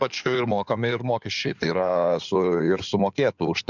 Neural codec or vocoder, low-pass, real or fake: codec, 16 kHz in and 24 kHz out, 2.2 kbps, FireRedTTS-2 codec; 7.2 kHz; fake